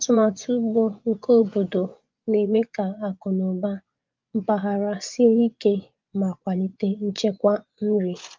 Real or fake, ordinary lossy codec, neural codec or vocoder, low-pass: fake; Opus, 32 kbps; vocoder, 24 kHz, 100 mel bands, Vocos; 7.2 kHz